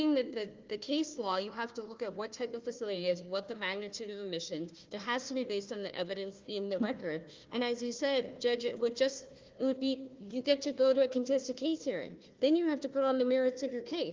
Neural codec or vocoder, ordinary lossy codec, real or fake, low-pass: codec, 16 kHz, 1 kbps, FunCodec, trained on Chinese and English, 50 frames a second; Opus, 24 kbps; fake; 7.2 kHz